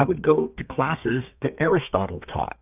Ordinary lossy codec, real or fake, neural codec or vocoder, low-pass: AAC, 32 kbps; fake; codec, 32 kHz, 1.9 kbps, SNAC; 3.6 kHz